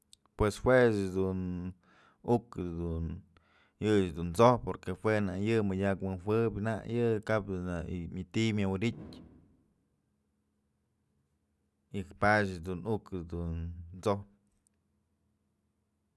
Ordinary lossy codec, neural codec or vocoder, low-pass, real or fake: none; none; none; real